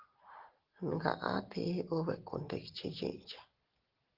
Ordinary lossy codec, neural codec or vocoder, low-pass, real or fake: Opus, 16 kbps; none; 5.4 kHz; real